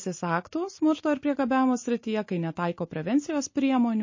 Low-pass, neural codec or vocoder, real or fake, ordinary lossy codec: 7.2 kHz; none; real; MP3, 32 kbps